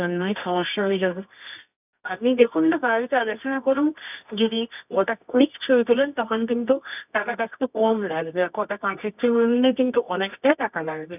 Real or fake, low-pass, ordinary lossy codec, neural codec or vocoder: fake; 3.6 kHz; none; codec, 24 kHz, 0.9 kbps, WavTokenizer, medium music audio release